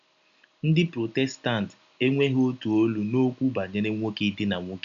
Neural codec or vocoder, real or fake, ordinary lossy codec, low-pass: none; real; none; 7.2 kHz